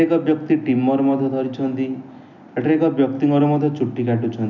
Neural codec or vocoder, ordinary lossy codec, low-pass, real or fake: none; none; 7.2 kHz; real